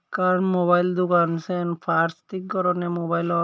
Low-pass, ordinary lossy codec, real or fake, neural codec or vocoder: none; none; real; none